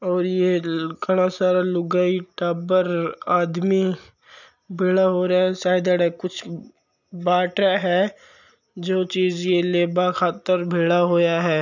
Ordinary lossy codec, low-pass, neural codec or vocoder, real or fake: none; 7.2 kHz; none; real